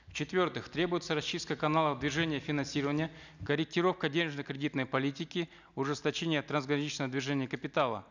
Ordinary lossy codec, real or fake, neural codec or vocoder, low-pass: none; real; none; 7.2 kHz